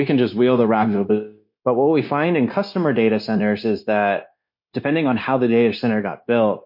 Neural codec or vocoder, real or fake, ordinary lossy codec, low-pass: codec, 16 kHz, 0.9 kbps, LongCat-Audio-Codec; fake; MP3, 32 kbps; 5.4 kHz